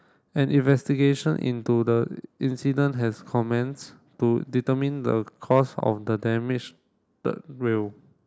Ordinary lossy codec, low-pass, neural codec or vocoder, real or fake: none; none; none; real